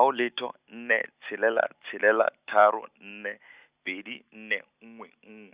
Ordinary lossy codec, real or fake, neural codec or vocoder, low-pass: Opus, 64 kbps; real; none; 3.6 kHz